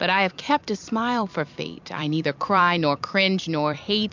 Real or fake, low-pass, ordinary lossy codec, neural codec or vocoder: fake; 7.2 kHz; MP3, 64 kbps; vocoder, 44.1 kHz, 128 mel bands every 256 samples, BigVGAN v2